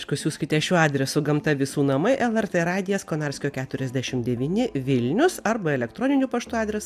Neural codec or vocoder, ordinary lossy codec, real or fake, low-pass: none; AAC, 96 kbps; real; 14.4 kHz